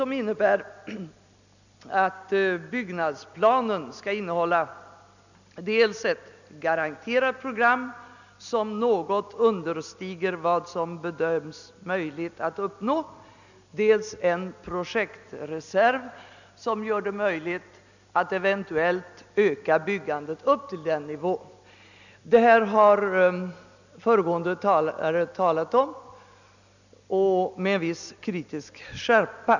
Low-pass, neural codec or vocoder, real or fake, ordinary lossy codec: 7.2 kHz; none; real; none